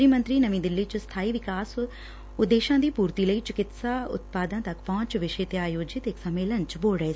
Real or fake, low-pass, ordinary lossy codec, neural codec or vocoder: real; none; none; none